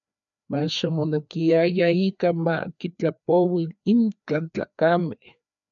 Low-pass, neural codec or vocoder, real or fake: 7.2 kHz; codec, 16 kHz, 2 kbps, FreqCodec, larger model; fake